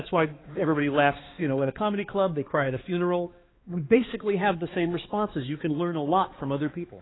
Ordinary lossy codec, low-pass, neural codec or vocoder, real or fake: AAC, 16 kbps; 7.2 kHz; codec, 16 kHz, 2 kbps, X-Codec, HuBERT features, trained on balanced general audio; fake